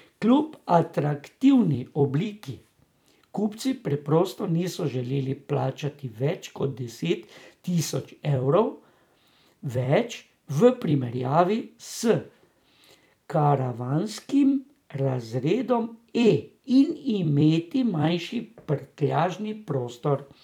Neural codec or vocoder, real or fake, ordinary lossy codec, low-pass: vocoder, 44.1 kHz, 128 mel bands every 256 samples, BigVGAN v2; fake; none; 19.8 kHz